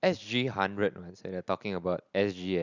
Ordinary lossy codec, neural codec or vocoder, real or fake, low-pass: none; none; real; 7.2 kHz